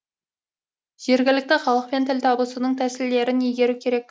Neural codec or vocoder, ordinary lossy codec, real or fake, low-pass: none; none; real; none